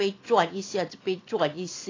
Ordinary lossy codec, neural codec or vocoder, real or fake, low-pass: AAC, 32 kbps; none; real; 7.2 kHz